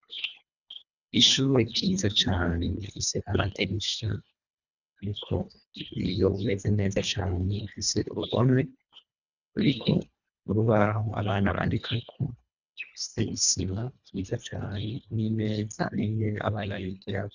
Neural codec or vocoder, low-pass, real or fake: codec, 24 kHz, 1.5 kbps, HILCodec; 7.2 kHz; fake